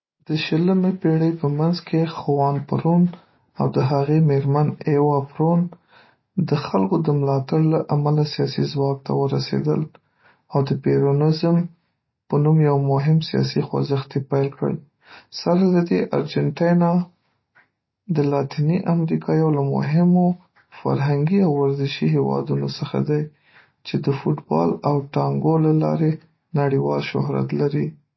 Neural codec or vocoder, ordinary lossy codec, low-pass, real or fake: none; MP3, 24 kbps; 7.2 kHz; real